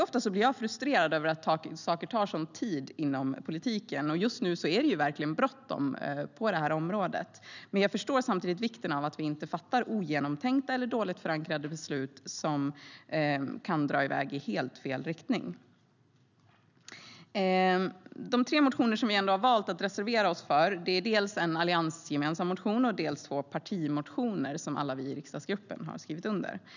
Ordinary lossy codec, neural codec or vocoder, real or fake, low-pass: none; none; real; 7.2 kHz